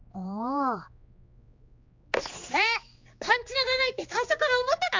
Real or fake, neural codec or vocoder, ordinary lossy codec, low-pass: fake; codec, 16 kHz, 2 kbps, X-Codec, HuBERT features, trained on general audio; MP3, 64 kbps; 7.2 kHz